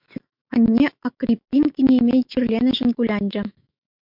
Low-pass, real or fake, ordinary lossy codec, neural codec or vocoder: 5.4 kHz; fake; MP3, 48 kbps; autoencoder, 48 kHz, 128 numbers a frame, DAC-VAE, trained on Japanese speech